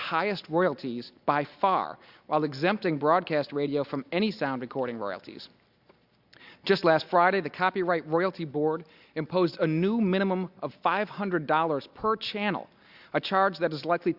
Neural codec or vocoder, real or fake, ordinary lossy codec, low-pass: none; real; Opus, 64 kbps; 5.4 kHz